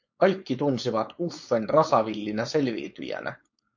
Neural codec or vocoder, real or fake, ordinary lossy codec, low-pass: codec, 16 kHz, 4.8 kbps, FACodec; fake; MP3, 48 kbps; 7.2 kHz